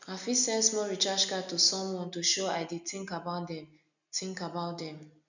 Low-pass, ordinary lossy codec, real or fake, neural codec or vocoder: 7.2 kHz; none; real; none